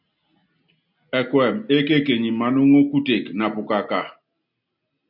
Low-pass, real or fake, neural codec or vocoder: 5.4 kHz; real; none